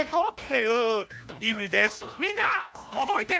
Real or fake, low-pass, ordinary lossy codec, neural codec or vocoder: fake; none; none; codec, 16 kHz, 1 kbps, FunCodec, trained on LibriTTS, 50 frames a second